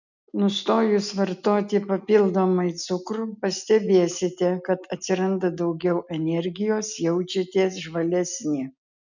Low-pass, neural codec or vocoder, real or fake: 7.2 kHz; none; real